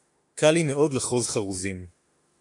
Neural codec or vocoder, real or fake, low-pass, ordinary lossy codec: autoencoder, 48 kHz, 32 numbers a frame, DAC-VAE, trained on Japanese speech; fake; 10.8 kHz; AAC, 48 kbps